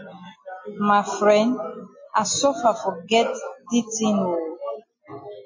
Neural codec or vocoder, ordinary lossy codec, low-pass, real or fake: none; MP3, 32 kbps; 7.2 kHz; real